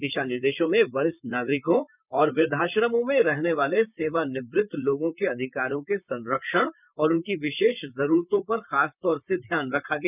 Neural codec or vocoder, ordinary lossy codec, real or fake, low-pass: vocoder, 44.1 kHz, 128 mel bands, Pupu-Vocoder; none; fake; 3.6 kHz